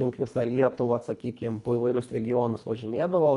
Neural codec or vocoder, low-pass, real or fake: codec, 24 kHz, 1.5 kbps, HILCodec; 10.8 kHz; fake